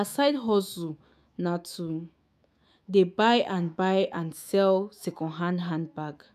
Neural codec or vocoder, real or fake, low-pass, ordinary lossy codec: autoencoder, 48 kHz, 128 numbers a frame, DAC-VAE, trained on Japanese speech; fake; 14.4 kHz; AAC, 96 kbps